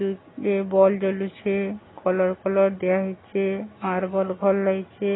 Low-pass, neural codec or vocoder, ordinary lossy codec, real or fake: 7.2 kHz; none; AAC, 16 kbps; real